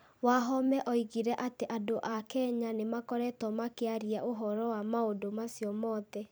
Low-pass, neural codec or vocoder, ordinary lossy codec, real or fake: none; vocoder, 44.1 kHz, 128 mel bands every 256 samples, BigVGAN v2; none; fake